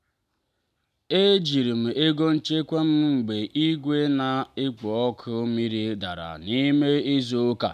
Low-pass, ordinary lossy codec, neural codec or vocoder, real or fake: 14.4 kHz; none; none; real